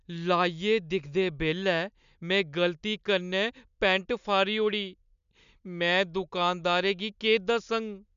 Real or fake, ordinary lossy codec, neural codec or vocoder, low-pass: real; none; none; 7.2 kHz